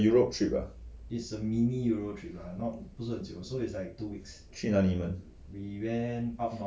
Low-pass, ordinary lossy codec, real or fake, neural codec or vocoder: none; none; real; none